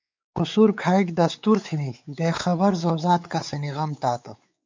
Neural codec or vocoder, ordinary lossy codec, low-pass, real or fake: codec, 16 kHz, 4 kbps, X-Codec, WavLM features, trained on Multilingual LibriSpeech; MP3, 64 kbps; 7.2 kHz; fake